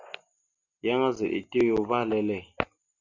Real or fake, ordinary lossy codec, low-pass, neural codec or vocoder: real; Opus, 64 kbps; 7.2 kHz; none